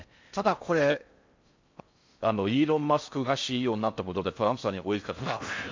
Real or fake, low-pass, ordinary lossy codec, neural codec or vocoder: fake; 7.2 kHz; MP3, 48 kbps; codec, 16 kHz in and 24 kHz out, 0.6 kbps, FocalCodec, streaming, 4096 codes